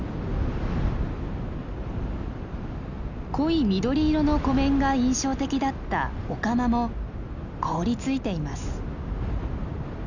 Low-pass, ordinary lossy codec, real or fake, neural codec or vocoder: 7.2 kHz; none; real; none